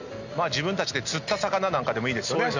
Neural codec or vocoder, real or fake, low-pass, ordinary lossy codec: none; real; 7.2 kHz; none